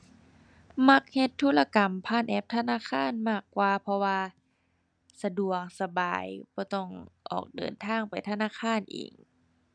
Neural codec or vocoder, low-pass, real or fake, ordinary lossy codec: none; 9.9 kHz; real; none